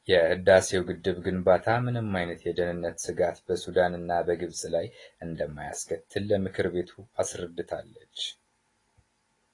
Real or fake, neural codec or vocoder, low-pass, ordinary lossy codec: real; none; 10.8 kHz; AAC, 32 kbps